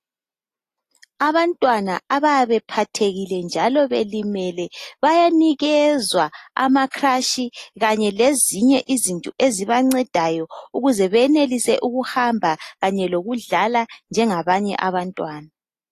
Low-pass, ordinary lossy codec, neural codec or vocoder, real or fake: 14.4 kHz; AAC, 48 kbps; none; real